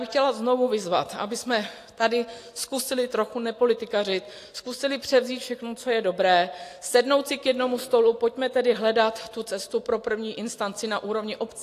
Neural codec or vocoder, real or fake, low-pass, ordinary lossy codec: none; real; 14.4 kHz; AAC, 64 kbps